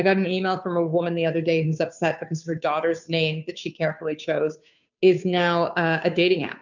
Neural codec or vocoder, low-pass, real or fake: codec, 16 kHz, 2 kbps, FunCodec, trained on Chinese and English, 25 frames a second; 7.2 kHz; fake